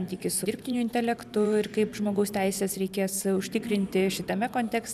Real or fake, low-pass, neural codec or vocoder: fake; 14.4 kHz; vocoder, 44.1 kHz, 128 mel bands every 512 samples, BigVGAN v2